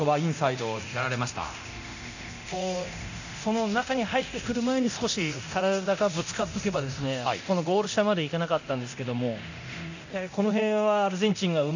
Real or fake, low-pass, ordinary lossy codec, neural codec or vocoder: fake; 7.2 kHz; none; codec, 24 kHz, 0.9 kbps, DualCodec